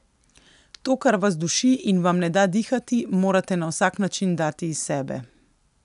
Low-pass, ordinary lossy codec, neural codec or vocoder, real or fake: 10.8 kHz; none; none; real